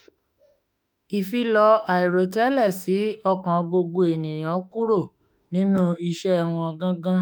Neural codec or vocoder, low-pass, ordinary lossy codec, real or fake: autoencoder, 48 kHz, 32 numbers a frame, DAC-VAE, trained on Japanese speech; none; none; fake